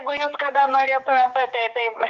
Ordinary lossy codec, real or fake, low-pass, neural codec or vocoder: Opus, 24 kbps; fake; 7.2 kHz; codec, 16 kHz, 4 kbps, X-Codec, HuBERT features, trained on general audio